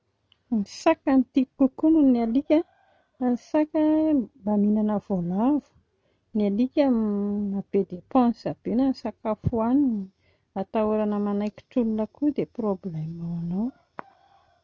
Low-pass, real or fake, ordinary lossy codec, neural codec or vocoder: none; real; none; none